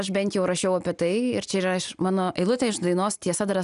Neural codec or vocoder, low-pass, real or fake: none; 10.8 kHz; real